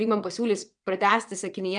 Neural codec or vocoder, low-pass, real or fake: vocoder, 22.05 kHz, 80 mel bands, WaveNeXt; 9.9 kHz; fake